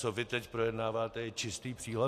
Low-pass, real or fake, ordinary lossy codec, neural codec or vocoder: 14.4 kHz; real; AAC, 64 kbps; none